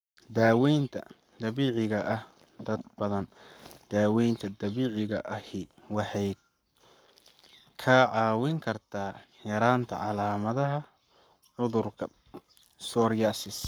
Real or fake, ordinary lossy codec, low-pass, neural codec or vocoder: fake; none; none; codec, 44.1 kHz, 7.8 kbps, Pupu-Codec